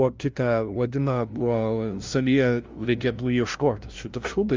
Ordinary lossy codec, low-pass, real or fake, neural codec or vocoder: Opus, 32 kbps; 7.2 kHz; fake; codec, 16 kHz, 0.5 kbps, FunCodec, trained on LibriTTS, 25 frames a second